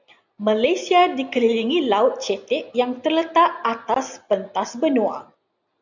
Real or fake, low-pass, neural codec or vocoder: real; 7.2 kHz; none